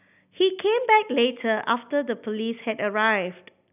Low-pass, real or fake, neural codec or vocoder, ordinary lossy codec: 3.6 kHz; real; none; none